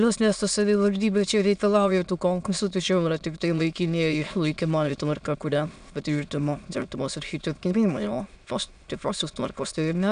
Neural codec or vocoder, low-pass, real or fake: autoencoder, 22.05 kHz, a latent of 192 numbers a frame, VITS, trained on many speakers; 9.9 kHz; fake